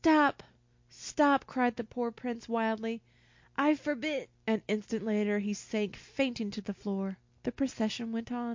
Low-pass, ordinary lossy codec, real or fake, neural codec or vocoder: 7.2 kHz; MP3, 48 kbps; real; none